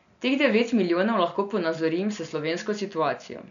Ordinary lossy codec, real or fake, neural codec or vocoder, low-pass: none; real; none; 7.2 kHz